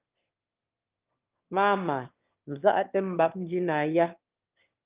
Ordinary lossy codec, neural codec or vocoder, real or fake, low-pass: Opus, 32 kbps; autoencoder, 22.05 kHz, a latent of 192 numbers a frame, VITS, trained on one speaker; fake; 3.6 kHz